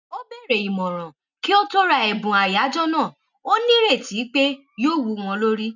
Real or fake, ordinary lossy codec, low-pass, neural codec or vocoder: real; none; 7.2 kHz; none